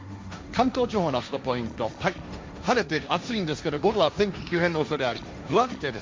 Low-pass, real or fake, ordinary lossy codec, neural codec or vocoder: none; fake; none; codec, 16 kHz, 1.1 kbps, Voila-Tokenizer